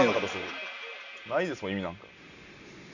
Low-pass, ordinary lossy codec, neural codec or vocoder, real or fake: 7.2 kHz; none; none; real